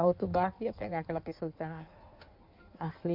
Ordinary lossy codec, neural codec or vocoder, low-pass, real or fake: MP3, 48 kbps; codec, 16 kHz in and 24 kHz out, 1.1 kbps, FireRedTTS-2 codec; 5.4 kHz; fake